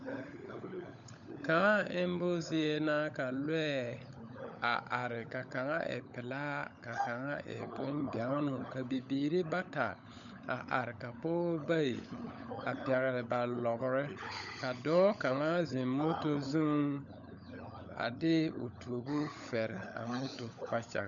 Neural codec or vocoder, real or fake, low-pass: codec, 16 kHz, 16 kbps, FunCodec, trained on LibriTTS, 50 frames a second; fake; 7.2 kHz